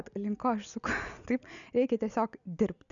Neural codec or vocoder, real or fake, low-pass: none; real; 7.2 kHz